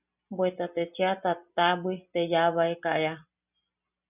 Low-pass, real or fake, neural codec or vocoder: 3.6 kHz; real; none